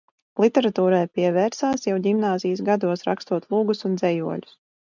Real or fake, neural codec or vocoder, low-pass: real; none; 7.2 kHz